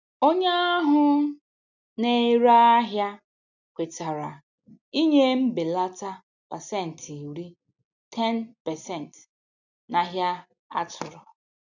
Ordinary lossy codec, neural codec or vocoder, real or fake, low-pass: none; none; real; 7.2 kHz